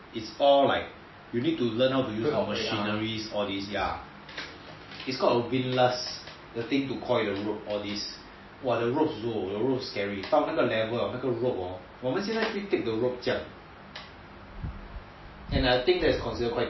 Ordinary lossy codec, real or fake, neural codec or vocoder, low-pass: MP3, 24 kbps; real; none; 7.2 kHz